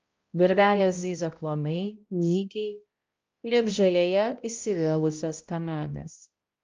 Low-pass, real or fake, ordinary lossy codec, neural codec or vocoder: 7.2 kHz; fake; Opus, 24 kbps; codec, 16 kHz, 0.5 kbps, X-Codec, HuBERT features, trained on balanced general audio